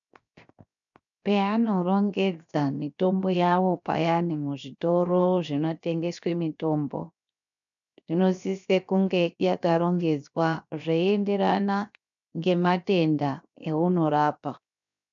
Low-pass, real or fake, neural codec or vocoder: 7.2 kHz; fake; codec, 16 kHz, 0.7 kbps, FocalCodec